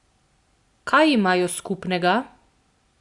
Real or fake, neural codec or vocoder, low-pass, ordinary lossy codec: real; none; 10.8 kHz; Opus, 64 kbps